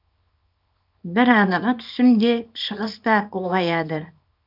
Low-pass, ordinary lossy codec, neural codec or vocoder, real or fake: 5.4 kHz; none; codec, 24 kHz, 0.9 kbps, WavTokenizer, small release; fake